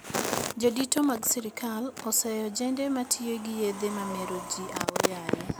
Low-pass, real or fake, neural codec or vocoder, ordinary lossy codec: none; real; none; none